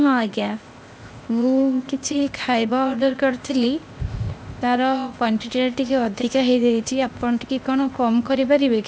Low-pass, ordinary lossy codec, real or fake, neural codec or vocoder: none; none; fake; codec, 16 kHz, 0.8 kbps, ZipCodec